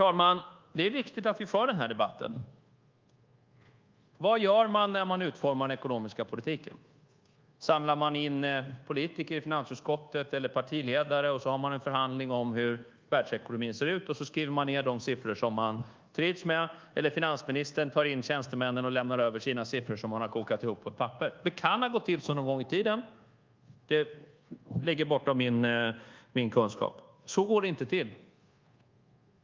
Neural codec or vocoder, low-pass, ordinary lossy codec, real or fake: codec, 24 kHz, 1.2 kbps, DualCodec; 7.2 kHz; Opus, 24 kbps; fake